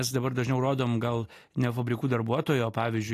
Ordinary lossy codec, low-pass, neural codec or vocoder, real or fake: AAC, 48 kbps; 14.4 kHz; none; real